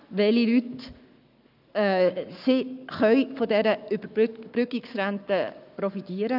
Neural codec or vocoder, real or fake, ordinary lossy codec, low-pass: codec, 44.1 kHz, 7.8 kbps, Pupu-Codec; fake; none; 5.4 kHz